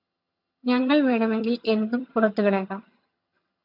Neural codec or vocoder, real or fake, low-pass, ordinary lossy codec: vocoder, 22.05 kHz, 80 mel bands, HiFi-GAN; fake; 5.4 kHz; MP3, 48 kbps